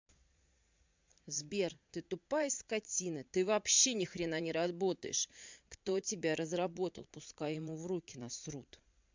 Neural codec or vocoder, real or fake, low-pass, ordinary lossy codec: vocoder, 22.05 kHz, 80 mel bands, Vocos; fake; 7.2 kHz; MP3, 64 kbps